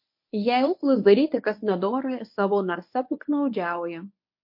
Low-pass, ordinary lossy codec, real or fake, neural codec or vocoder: 5.4 kHz; MP3, 32 kbps; fake; codec, 24 kHz, 0.9 kbps, WavTokenizer, medium speech release version 1